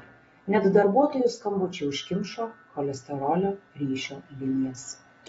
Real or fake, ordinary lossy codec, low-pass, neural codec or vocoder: real; AAC, 24 kbps; 19.8 kHz; none